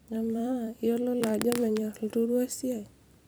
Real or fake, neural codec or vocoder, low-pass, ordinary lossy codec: fake; vocoder, 44.1 kHz, 128 mel bands every 256 samples, BigVGAN v2; none; none